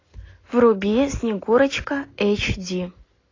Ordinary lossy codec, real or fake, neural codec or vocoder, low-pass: AAC, 32 kbps; real; none; 7.2 kHz